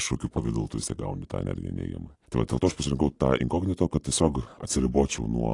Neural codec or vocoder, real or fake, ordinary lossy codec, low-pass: none; real; AAC, 48 kbps; 10.8 kHz